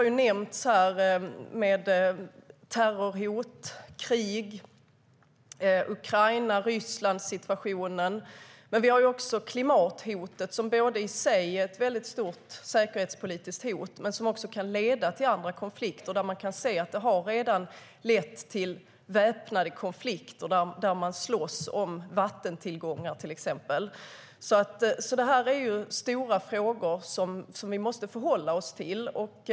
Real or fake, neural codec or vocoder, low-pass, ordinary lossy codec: real; none; none; none